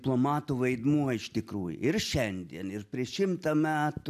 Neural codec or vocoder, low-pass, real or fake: none; 14.4 kHz; real